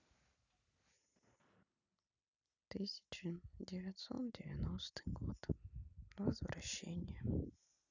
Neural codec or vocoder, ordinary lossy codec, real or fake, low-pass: none; none; real; 7.2 kHz